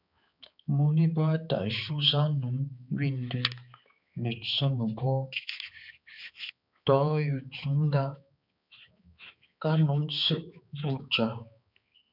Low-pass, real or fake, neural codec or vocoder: 5.4 kHz; fake; codec, 16 kHz, 4 kbps, X-Codec, HuBERT features, trained on balanced general audio